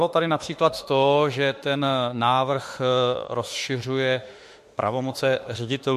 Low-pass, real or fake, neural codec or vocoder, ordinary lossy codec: 14.4 kHz; fake; autoencoder, 48 kHz, 32 numbers a frame, DAC-VAE, trained on Japanese speech; MP3, 64 kbps